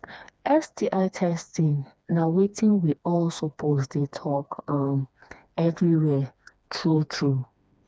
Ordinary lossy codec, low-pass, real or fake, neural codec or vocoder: none; none; fake; codec, 16 kHz, 2 kbps, FreqCodec, smaller model